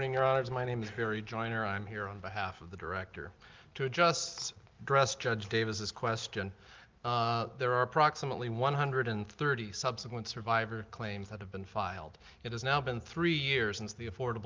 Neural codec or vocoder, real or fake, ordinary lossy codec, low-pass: none; real; Opus, 32 kbps; 7.2 kHz